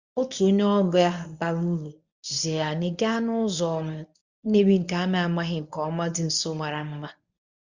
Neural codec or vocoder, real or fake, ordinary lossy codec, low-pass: codec, 24 kHz, 0.9 kbps, WavTokenizer, medium speech release version 1; fake; Opus, 64 kbps; 7.2 kHz